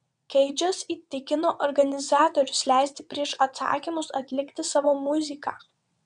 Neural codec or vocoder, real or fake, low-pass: vocoder, 22.05 kHz, 80 mel bands, WaveNeXt; fake; 9.9 kHz